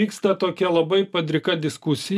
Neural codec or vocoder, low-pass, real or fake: none; 14.4 kHz; real